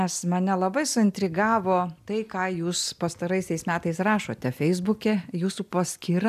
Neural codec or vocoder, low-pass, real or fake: none; 14.4 kHz; real